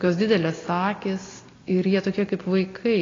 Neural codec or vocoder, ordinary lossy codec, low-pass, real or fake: none; AAC, 32 kbps; 7.2 kHz; real